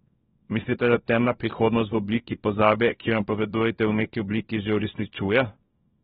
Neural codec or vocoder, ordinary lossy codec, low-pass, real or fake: codec, 24 kHz, 0.9 kbps, WavTokenizer, small release; AAC, 16 kbps; 10.8 kHz; fake